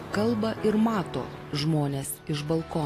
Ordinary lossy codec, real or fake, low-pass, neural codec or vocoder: AAC, 48 kbps; real; 14.4 kHz; none